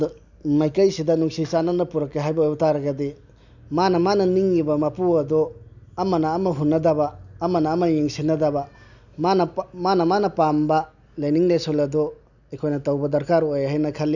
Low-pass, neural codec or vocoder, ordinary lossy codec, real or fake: 7.2 kHz; none; none; real